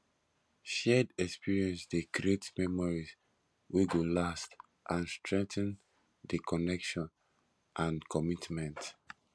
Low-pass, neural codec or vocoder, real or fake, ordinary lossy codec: none; none; real; none